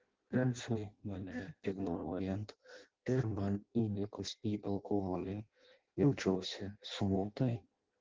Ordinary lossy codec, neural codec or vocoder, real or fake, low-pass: Opus, 16 kbps; codec, 16 kHz in and 24 kHz out, 0.6 kbps, FireRedTTS-2 codec; fake; 7.2 kHz